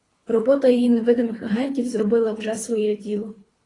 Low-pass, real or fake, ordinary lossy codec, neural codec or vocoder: 10.8 kHz; fake; AAC, 32 kbps; codec, 24 kHz, 3 kbps, HILCodec